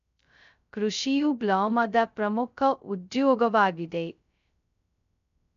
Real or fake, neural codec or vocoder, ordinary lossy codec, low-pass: fake; codec, 16 kHz, 0.2 kbps, FocalCodec; none; 7.2 kHz